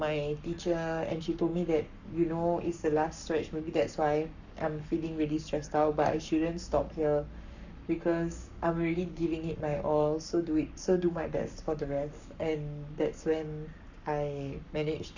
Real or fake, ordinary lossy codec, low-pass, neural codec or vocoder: fake; none; 7.2 kHz; codec, 44.1 kHz, 7.8 kbps, Pupu-Codec